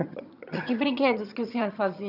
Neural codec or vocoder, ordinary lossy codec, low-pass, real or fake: vocoder, 22.05 kHz, 80 mel bands, HiFi-GAN; none; 5.4 kHz; fake